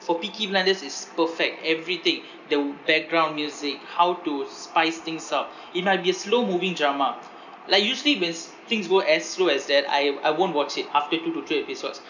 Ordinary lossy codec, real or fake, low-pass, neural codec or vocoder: none; real; 7.2 kHz; none